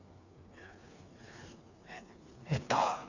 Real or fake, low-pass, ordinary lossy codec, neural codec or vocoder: fake; 7.2 kHz; none; codec, 16 kHz, 4 kbps, FreqCodec, smaller model